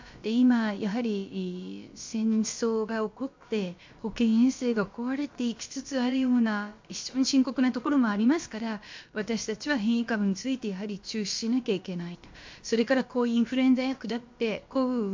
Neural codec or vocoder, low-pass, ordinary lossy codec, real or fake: codec, 16 kHz, about 1 kbps, DyCAST, with the encoder's durations; 7.2 kHz; MP3, 48 kbps; fake